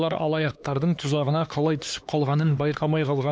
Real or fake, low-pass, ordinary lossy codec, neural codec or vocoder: fake; none; none; codec, 16 kHz, 2 kbps, X-Codec, HuBERT features, trained on LibriSpeech